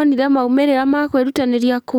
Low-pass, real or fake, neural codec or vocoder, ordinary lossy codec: 19.8 kHz; fake; codec, 44.1 kHz, 7.8 kbps, DAC; none